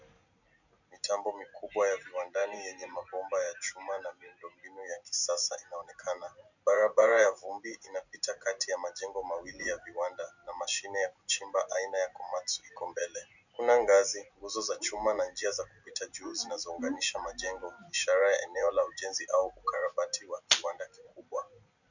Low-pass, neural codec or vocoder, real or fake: 7.2 kHz; none; real